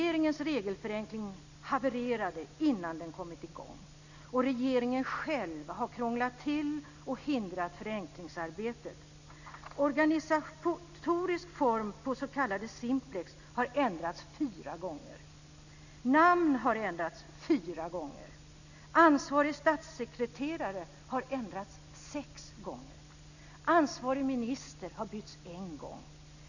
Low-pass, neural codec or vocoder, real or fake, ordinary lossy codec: 7.2 kHz; none; real; none